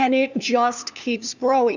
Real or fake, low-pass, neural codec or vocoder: fake; 7.2 kHz; codec, 16 kHz, 2 kbps, FunCodec, trained on LibriTTS, 25 frames a second